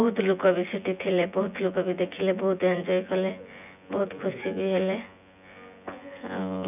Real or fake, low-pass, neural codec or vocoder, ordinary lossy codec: fake; 3.6 kHz; vocoder, 24 kHz, 100 mel bands, Vocos; none